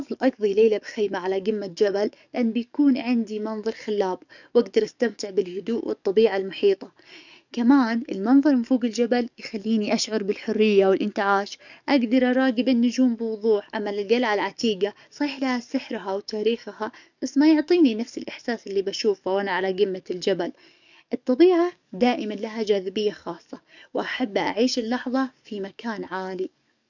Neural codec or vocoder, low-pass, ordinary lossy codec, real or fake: codec, 44.1 kHz, 7.8 kbps, DAC; 7.2 kHz; none; fake